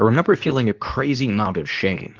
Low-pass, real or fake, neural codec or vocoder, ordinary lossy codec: 7.2 kHz; fake; codec, 24 kHz, 0.9 kbps, WavTokenizer, medium speech release version 2; Opus, 24 kbps